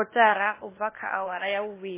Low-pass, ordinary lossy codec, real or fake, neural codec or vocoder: 3.6 kHz; MP3, 16 kbps; fake; codec, 16 kHz, 0.8 kbps, ZipCodec